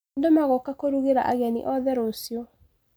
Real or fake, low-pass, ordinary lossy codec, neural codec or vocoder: real; none; none; none